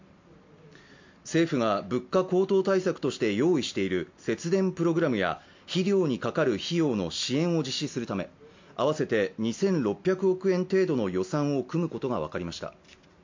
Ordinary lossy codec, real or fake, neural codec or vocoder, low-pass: MP3, 48 kbps; real; none; 7.2 kHz